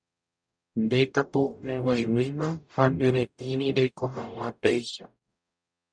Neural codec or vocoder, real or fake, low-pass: codec, 44.1 kHz, 0.9 kbps, DAC; fake; 9.9 kHz